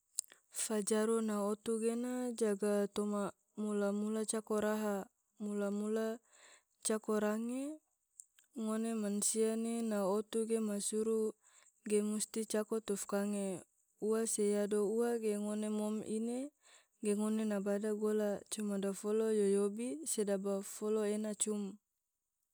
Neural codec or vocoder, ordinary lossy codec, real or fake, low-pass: none; none; real; none